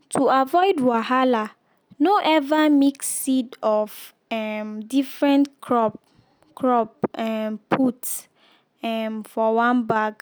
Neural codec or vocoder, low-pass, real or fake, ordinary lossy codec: none; none; real; none